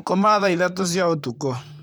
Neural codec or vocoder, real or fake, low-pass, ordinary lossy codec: vocoder, 44.1 kHz, 128 mel bands, Pupu-Vocoder; fake; none; none